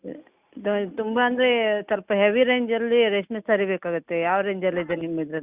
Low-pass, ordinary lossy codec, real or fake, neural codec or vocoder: 3.6 kHz; Opus, 64 kbps; real; none